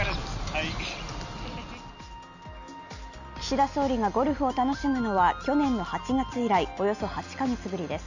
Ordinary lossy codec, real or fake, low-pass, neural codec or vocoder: none; real; 7.2 kHz; none